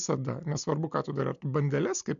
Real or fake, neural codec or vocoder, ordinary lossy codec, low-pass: real; none; MP3, 64 kbps; 7.2 kHz